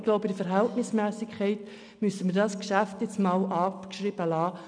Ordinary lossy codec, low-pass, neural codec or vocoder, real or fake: none; 9.9 kHz; none; real